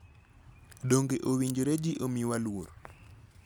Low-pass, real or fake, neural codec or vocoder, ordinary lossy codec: none; real; none; none